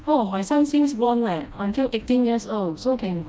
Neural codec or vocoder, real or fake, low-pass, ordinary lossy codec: codec, 16 kHz, 1 kbps, FreqCodec, smaller model; fake; none; none